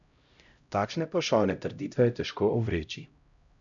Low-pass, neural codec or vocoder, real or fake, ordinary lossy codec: 7.2 kHz; codec, 16 kHz, 0.5 kbps, X-Codec, HuBERT features, trained on LibriSpeech; fake; none